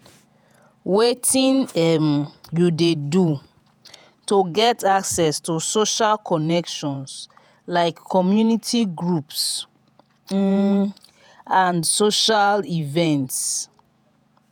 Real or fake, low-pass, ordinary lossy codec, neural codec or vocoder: fake; none; none; vocoder, 48 kHz, 128 mel bands, Vocos